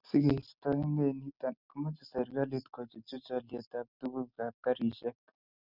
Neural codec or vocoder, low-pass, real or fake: none; 5.4 kHz; real